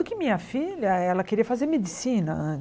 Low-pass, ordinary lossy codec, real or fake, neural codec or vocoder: none; none; real; none